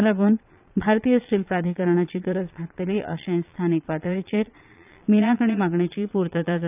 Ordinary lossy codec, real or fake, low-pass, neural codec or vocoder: none; fake; 3.6 kHz; vocoder, 22.05 kHz, 80 mel bands, Vocos